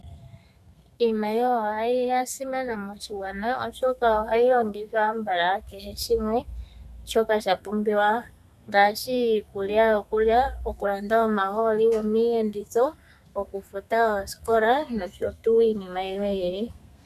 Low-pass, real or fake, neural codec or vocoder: 14.4 kHz; fake; codec, 32 kHz, 1.9 kbps, SNAC